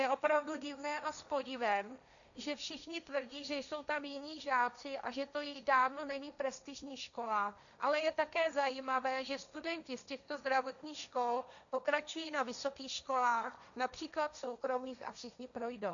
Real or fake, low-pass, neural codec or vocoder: fake; 7.2 kHz; codec, 16 kHz, 1.1 kbps, Voila-Tokenizer